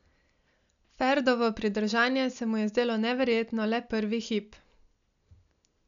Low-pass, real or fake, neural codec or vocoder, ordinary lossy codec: 7.2 kHz; real; none; none